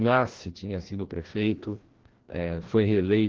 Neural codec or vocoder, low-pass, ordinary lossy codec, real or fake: codec, 16 kHz, 1 kbps, FreqCodec, larger model; 7.2 kHz; Opus, 16 kbps; fake